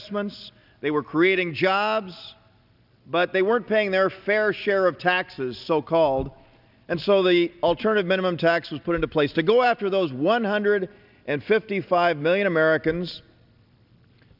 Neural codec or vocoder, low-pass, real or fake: none; 5.4 kHz; real